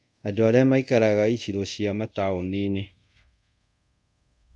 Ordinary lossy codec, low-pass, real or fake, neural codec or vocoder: none; 10.8 kHz; fake; codec, 24 kHz, 0.5 kbps, DualCodec